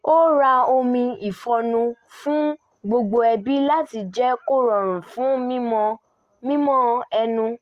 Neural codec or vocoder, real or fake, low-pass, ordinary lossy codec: none; real; 14.4 kHz; Opus, 24 kbps